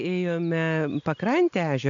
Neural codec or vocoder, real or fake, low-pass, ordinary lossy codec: none; real; 7.2 kHz; MP3, 64 kbps